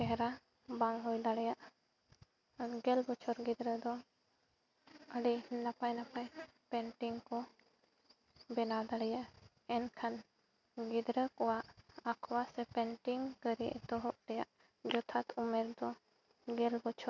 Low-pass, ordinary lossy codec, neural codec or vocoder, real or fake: 7.2 kHz; none; none; real